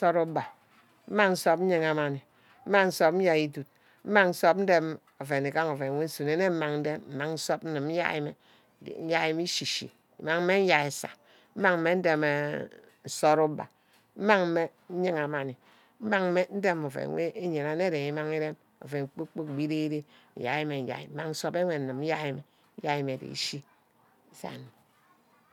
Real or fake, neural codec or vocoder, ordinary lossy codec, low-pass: real; none; none; 19.8 kHz